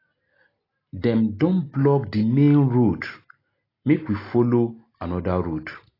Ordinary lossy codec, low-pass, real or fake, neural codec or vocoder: AAC, 24 kbps; 5.4 kHz; real; none